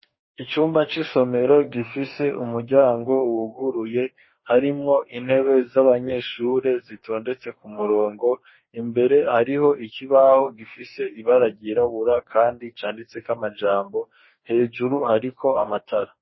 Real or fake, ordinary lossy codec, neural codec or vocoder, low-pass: fake; MP3, 24 kbps; codec, 44.1 kHz, 2.6 kbps, DAC; 7.2 kHz